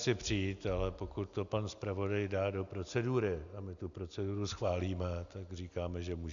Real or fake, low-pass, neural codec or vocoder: real; 7.2 kHz; none